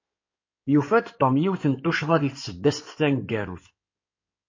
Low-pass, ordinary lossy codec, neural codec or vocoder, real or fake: 7.2 kHz; MP3, 32 kbps; codec, 16 kHz in and 24 kHz out, 2.2 kbps, FireRedTTS-2 codec; fake